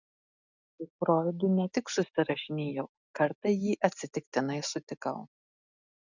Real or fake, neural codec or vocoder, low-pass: real; none; 7.2 kHz